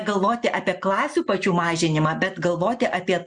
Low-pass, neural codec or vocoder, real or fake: 9.9 kHz; none; real